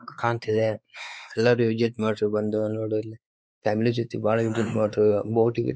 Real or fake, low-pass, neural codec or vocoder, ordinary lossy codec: fake; none; codec, 16 kHz, 4 kbps, X-Codec, WavLM features, trained on Multilingual LibriSpeech; none